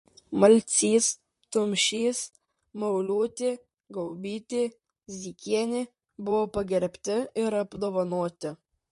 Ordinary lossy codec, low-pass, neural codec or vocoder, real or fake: MP3, 48 kbps; 14.4 kHz; vocoder, 44.1 kHz, 128 mel bands, Pupu-Vocoder; fake